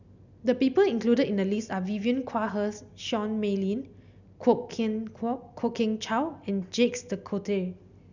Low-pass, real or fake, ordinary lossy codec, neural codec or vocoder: 7.2 kHz; real; none; none